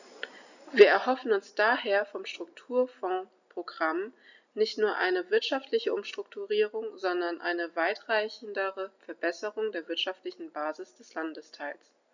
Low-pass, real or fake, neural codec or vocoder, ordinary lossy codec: 7.2 kHz; real; none; none